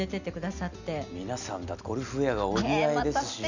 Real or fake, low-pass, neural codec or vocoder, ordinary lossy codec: real; 7.2 kHz; none; none